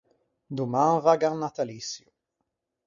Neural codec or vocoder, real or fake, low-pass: none; real; 7.2 kHz